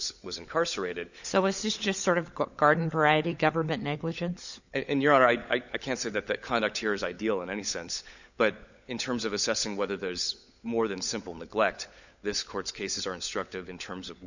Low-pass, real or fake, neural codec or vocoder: 7.2 kHz; fake; codec, 16 kHz, 16 kbps, FunCodec, trained on LibriTTS, 50 frames a second